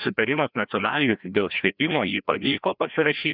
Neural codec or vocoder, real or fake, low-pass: codec, 16 kHz, 1 kbps, FreqCodec, larger model; fake; 5.4 kHz